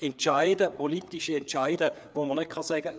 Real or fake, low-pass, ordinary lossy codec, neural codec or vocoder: fake; none; none; codec, 16 kHz, 4 kbps, FreqCodec, larger model